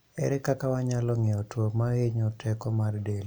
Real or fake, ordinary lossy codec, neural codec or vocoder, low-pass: real; none; none; none